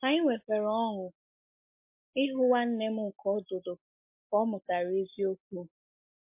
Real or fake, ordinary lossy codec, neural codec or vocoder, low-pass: real; MP3, 24 kbps; none; 3.6 kHz